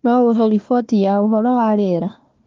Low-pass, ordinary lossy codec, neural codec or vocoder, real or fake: 7.2 kHz; Opus, 32 kbps; codec, 16 kHz, 1 kbps, FunCodec, trained on Chinese and English, 50 frames a second; fake